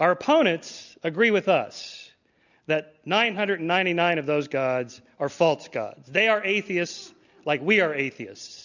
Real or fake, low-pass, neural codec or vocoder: real; 7.2 kHz; none